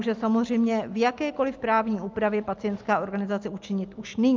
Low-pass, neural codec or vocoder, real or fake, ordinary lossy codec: 7.2 kHz; none; real; Opus, 32 kbps